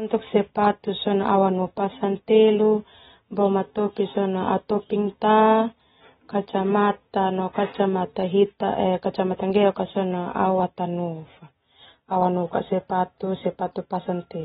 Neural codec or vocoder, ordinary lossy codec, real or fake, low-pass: none; AAC, 16 kbps; real; 19.8 kHz